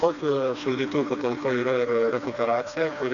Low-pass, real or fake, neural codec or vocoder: 7.2 kHz; fake; codec, 16 kHz, 2 kbps, FreqCodec, smaller model